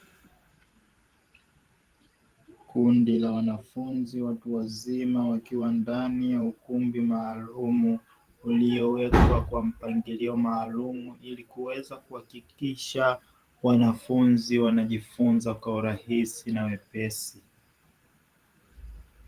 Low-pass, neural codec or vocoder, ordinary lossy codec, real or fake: 14.4 kHz; vocoder, 44.1 kHz, 128 mel bands every 512 samples, BigVGAN v2; Opus, 24 kbps; fake